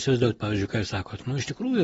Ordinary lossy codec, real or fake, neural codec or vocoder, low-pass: AAC, 24 kbps; real; none; 10.8 kHz